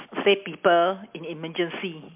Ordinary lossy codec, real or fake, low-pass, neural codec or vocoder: MP3, 32 kbps; real; 3.6 kHz; none